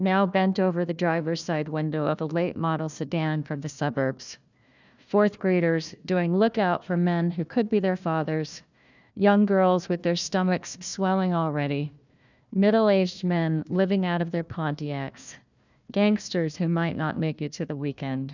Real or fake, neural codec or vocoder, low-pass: fake; codec, 16 kHz, 1 kbps, FunCodec, trained on Chinese and English, 50 frames a second; 7.2 kHz